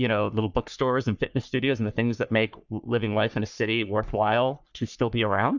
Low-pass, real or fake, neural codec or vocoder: 7.2 kHz; fake; codec, 44.1 kHz, 3.4 kbps, Pupu-Codec